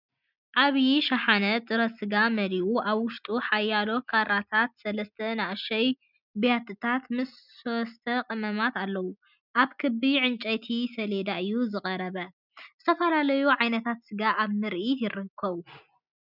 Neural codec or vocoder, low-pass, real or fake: none; 5.4 kHz; real